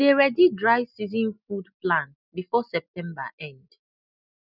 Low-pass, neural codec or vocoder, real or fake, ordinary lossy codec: 5.4 kHz; none; real; none